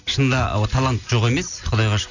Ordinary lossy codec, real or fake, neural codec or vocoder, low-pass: none; real; none; 7.2 kHz